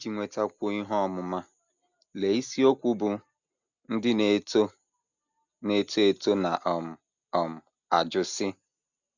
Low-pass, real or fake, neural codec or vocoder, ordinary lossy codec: 7.2 kHz; real; none; none